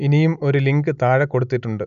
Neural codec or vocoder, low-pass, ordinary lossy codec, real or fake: none; 7.2 kHz; none; real